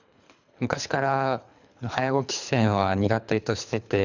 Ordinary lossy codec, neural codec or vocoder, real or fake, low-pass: none; codec, 24 kHz, 3 kbps, HILCodec; fake; 7.2 kHz